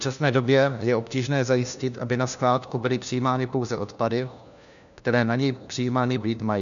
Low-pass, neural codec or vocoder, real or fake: 7.2 kHz; codec, 16 kHz, 1 kbps, FunCodec, trained on LibriTTS, 50 frames a second; fake